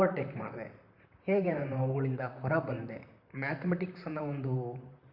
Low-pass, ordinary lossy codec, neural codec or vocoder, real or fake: 5.4 kHz; Opus, 64 kbps; vocoder, 44.1 kHz, 128 mel bands, Pupu-Vocoder; fake